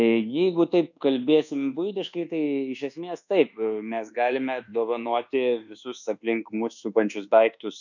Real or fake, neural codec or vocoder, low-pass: fake; codec, 24 kHz, 1.2 kbps, DualCodec; 7.2 kHz